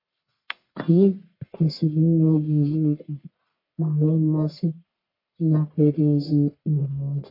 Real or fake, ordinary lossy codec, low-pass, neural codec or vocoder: fake; MP3, 32 kbps; 5.4 kHz; codec, 44.1 kHz, 1.7 kbps, Pupu-Codec